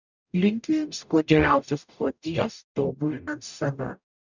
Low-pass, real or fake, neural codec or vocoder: 7.2 kHz; fake; codec, 44.1 kHz, 0.9 kbps, DAC